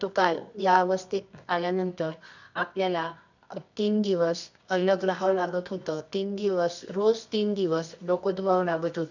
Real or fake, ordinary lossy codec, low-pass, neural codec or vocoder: fake; none; 7.2 kHz; codec, 24 kHz, 0.9 kbps, WavTokenizer, medium music audio release